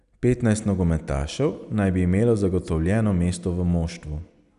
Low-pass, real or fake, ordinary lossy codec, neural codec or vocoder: 10.8 kHz; real; none; none